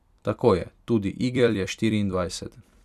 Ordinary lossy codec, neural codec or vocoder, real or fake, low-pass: none; vocoder, 44.1 kHz, 128 mel bands every 256 samples, BigVGAN v2; fake; 14.4 kHz